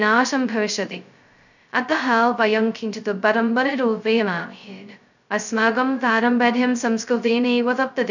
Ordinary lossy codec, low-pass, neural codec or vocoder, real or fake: none; 7.2 kHz; codec, 16 kHz, 0.2 kbps, FocalCodec; fake